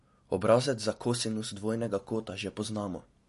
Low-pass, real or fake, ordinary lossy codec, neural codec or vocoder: 14.4 kHz; real; MP3, 48 kbps; none